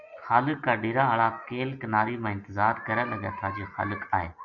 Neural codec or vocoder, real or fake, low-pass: none; real; 7.2 kHz